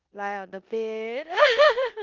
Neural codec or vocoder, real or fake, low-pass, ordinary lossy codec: codec, 16 kHz in and 24 kHz out, 0.9 kbps, LongCat-Audio-Codec, four codebook decoder; fake; 7.2 kHz; Opus, 32 kbps